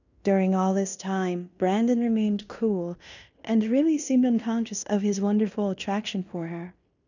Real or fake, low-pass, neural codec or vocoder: fake; 7.2 kHz; codec, 16 kHz in and 24 kHz out, 0.9 kbps, LongCat-Audio-Codec, fine tuned four codebook decoder